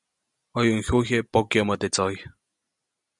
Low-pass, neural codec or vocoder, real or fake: 10.8 kHz; none; real